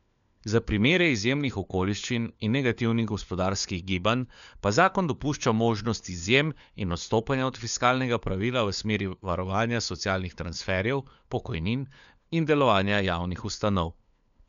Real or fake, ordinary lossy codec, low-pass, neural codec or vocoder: fake; none; 7.2 kHz; codec, 16 kHz, 4 kbps, FunCodec, trained on LibriTTS, 50 frames a second